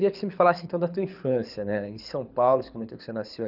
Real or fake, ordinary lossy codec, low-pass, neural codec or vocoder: fake; none; 5.4 kHz; codec, 24 kHz, 6 kbps, HILCodec